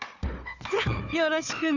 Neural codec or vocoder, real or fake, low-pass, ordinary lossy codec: codec, 16 kHz, 4 kbps, FunCodec, trained on Chinese and English, 50 frames a second; fake; 7.2 kHz; none